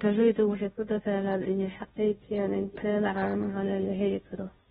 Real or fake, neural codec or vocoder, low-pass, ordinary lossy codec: fake; codec, 16 kHz, 0.5 kbps, FunCodec, trained on Chinese and English, 25 frames a second; 7.2 kHz; AAC, 16 kbps